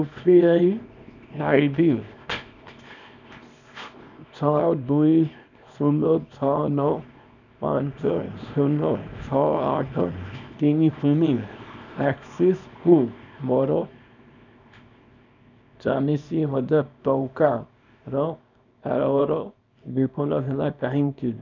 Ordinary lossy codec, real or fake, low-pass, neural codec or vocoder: none; fake; 7.2 kHz; codec, 24 kHz, 0.9 kbps, WavTokenizer, small release